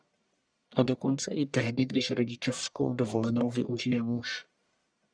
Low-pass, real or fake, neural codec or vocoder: 9.9 kHz; fake; codec, 44.1 kHz, 1.7 kbps, Pupu-Codec